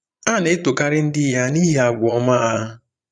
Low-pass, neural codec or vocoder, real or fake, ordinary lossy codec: 9.9 kHz; vocoder, 22.05 kHz, 80 mel bands, Vocos; fake; none